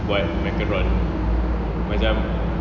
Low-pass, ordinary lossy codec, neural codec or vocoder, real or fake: 7.2 kHz; none; none; real